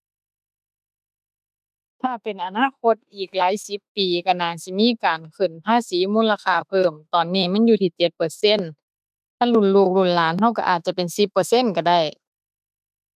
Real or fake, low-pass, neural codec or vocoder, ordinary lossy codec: fake; 14.4 kHz; autoencoder, 48 kHz, 32 numbers a frame, DAC-VAE, trained on Japanese speech; none